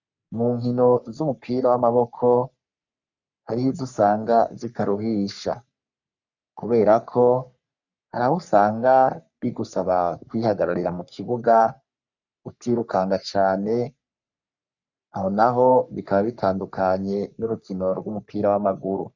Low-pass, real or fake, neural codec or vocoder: 7.2 kHz; fake; codec, 44.1 kHz, 3.4 kbps, Pupu-Codec